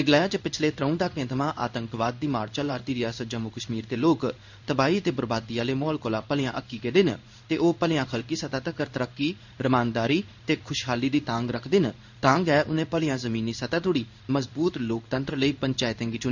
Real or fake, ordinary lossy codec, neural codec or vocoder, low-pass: fake; none; codec, 16 kHz in and 24 kHz out, 1 kbps, XY-Tokenizer; 7.2 kHz